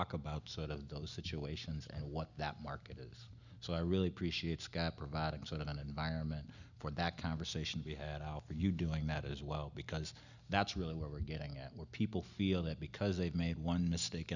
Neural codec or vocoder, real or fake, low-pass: none; real; 7.2 kHz